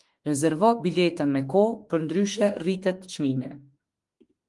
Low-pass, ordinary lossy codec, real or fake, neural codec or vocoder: 10.8 kHz; Opus, 24 kbps; fake; autoencoder, 48 kHz, 32 numbers a frame, DAC-VAE, trained on Japanese speech